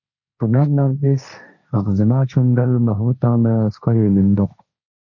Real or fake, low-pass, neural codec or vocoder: fake; 7.2 kHz; codec, 16 kHz, 1.1 kbps, Voila-Tokenizer